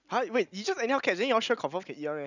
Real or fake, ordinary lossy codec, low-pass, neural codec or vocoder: real; none; 7.2 kHz; none